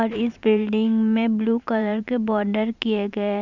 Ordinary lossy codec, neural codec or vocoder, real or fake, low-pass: none; vocoder, 44.1 kHz, 128 mel bands every 512 samples, BigVGAN v2; fake; 7.2 kHz